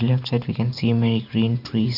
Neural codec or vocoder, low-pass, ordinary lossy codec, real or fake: vocoder, 44.1 kHz, 128 mel bands every 512 samples, BigVGAN v2; 5.4 kHz; none; fake